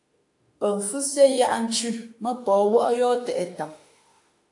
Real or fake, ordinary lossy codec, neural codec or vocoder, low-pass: fake; AAC, 64 kbps; autoencoder, 48 kHz, 32 numbers a frame, DAC-VAE, trained on Japanese speech; 10.8 kHz